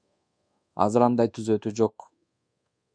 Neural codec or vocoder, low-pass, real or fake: codec, 24 kHz, 1.2 kbps, DualCodec; 9.9 kHz; fake